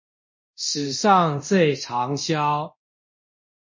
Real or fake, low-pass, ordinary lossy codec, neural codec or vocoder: fake; 7.2 kHz; MP3, 32 kbps; codec, 24 kHz, 0.9 kbps, DualCodec